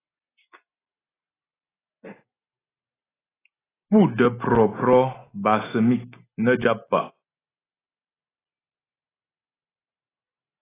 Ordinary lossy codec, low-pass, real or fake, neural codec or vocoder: AAC, 16 kbps; 3.6 kHz; real; none